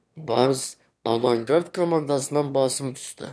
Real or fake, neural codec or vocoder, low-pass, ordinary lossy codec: fake; autoencoder, 22.05 kHz, a latent of 192 numbers a frame, VITS, trained on one speaker; none; none